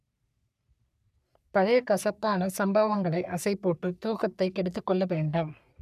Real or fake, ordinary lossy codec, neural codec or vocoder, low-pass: fake; none; codec, 44.1 kHz, 3.4 kbps, Pupu-Codec; 14.4 kHz